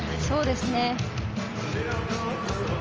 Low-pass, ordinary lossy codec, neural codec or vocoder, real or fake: 7.2 kHz; Opus, 24 kbps; none; real